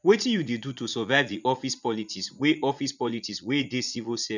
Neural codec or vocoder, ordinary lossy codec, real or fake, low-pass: vocoder, 44.1 kHz, 80 mel bands, Vocos; none; fake; 7.2 kHz